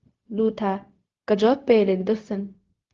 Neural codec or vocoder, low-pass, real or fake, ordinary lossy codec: codec, 16 kHz, 0.4 kbps, LongCat-Audio-Codec; 7.2 kHz; fake; Opus, 32 kbps